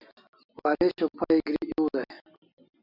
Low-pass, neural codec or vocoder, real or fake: 5.4 kHz; none; real